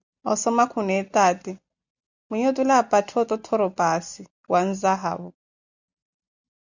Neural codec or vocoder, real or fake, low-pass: none; real; 7.2 kHz